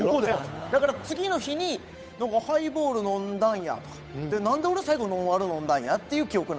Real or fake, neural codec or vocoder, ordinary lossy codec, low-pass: fake; codec, 16 kHz, 8 kbps, FunCodec, trained on Chinese and English, 25 frames a second; none; none